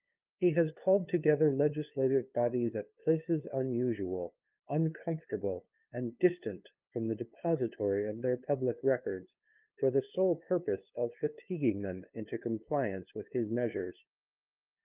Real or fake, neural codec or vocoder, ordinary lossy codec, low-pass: fake; codec, 16 kHz, 2 kbps, FunCodec, trained on LibriTTS, 25 frames a second; Opus, 24 kbps; 3.6 kHz